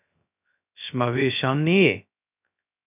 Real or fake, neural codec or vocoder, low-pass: fake; codec, 16 kHz, 0.2 kbps, FocalCodec; 3.6 kHz